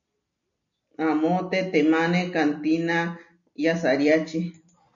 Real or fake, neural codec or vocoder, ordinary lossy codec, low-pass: real; none; MP3, 96 kbps; 7.2 kHz